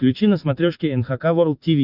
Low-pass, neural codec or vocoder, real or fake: 5.4 kHz; none; real